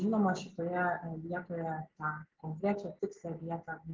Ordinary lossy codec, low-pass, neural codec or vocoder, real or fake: Opus, 16 kbps; 7.2 kHz; none; real